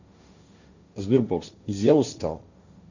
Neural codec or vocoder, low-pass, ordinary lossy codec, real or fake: codec, 16 kHz, 1.1 kbps, Voila-Tokenizer; 7.2 kHz; none; fake